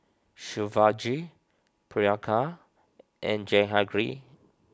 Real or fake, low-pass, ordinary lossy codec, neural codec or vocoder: real; none; none; none